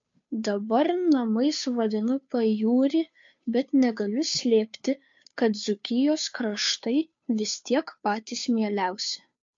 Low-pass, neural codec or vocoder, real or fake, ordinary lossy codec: 7.2 kHz; codec, 16 kHz, 2 kbps, FunCodec, trained on Chinese and English, 25 frames a second; fake; MP3, 48 kbps